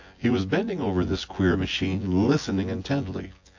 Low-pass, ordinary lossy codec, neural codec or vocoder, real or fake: 7.2 kHz; AAC, 48 kbps; vocoder, 24 kHz, 100 mel bands, Vocos; fake